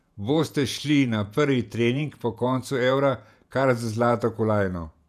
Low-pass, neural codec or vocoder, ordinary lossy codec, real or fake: 14.4 kHz; vocoder, 48 kHz, 128 mel bands, Vocos; none; fake